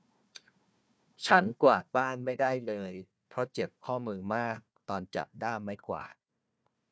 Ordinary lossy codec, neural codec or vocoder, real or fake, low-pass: none; codec, 16 kHz, 1 kbps, FunCodec, trained on Chinese and English, 50 frames a second; fake; none